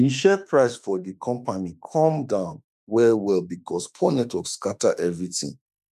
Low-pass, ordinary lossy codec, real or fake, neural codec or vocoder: 14.4 kHz; none; fake; autoencoder, 48 kHz, 32 numbers a frame, DAC-VAE, trained on Japanese speech